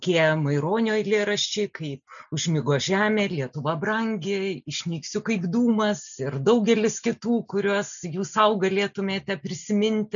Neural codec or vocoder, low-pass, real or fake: none; 7.2 kHz; real